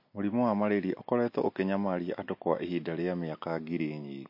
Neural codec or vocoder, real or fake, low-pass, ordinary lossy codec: none; real; 5.4 kHz; MP3, 32 kbps